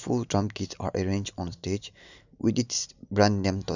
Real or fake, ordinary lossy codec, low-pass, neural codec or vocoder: real; none; 7.2 kHz; none